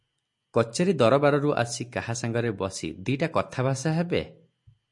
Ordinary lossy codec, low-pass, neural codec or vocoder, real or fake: MP3, 48 kbps; 10.8 kHz; none; real